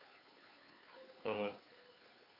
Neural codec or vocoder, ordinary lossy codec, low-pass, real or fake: codec, 16 kHz, 2 kbps, FunCodec, trained on Chinese and English, 25 frames a second; none; 5.4 kHz; fake